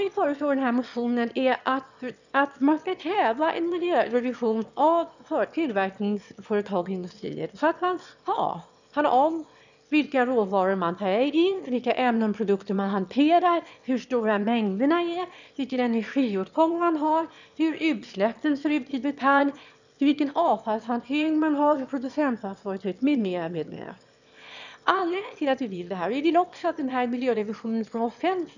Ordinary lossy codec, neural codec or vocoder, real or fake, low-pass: none; autoencoder, 22.05 kHz, a latent of 192 numbers a frame, VITS, trained on one speaker; fake; 7.2 kHz